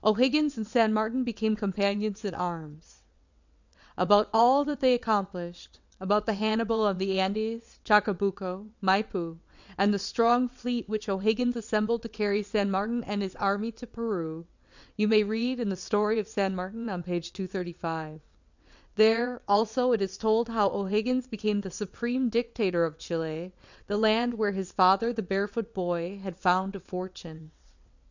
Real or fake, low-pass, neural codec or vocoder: fake; 7.2 kHz; vocoder, 22.05 kHz, 80 mel bands, WaveNeXt